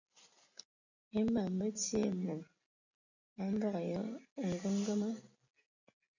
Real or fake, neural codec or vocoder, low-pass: real; none; 7.2 kHz